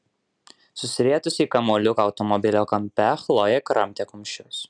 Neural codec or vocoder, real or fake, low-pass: none; real; 9.9 kHz